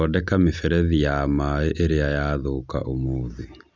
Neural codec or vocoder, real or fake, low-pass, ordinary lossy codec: none; real; none; none